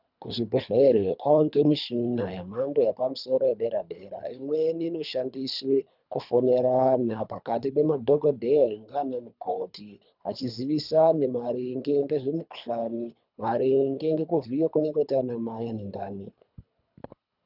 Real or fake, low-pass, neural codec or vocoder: fake; 5.4 kHz; codec, 24 kHz, 3 kbps, HILCodec